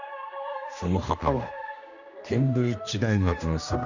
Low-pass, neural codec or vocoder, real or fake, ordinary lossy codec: 7.2 kHz; codec, 16 kHz, 1 kbps, X-Codec, HuBERT features, trained on general audio; fake; none